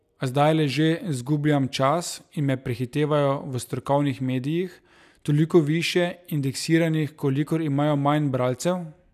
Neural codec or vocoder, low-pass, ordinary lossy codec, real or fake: none; 14.4 kHz; none; real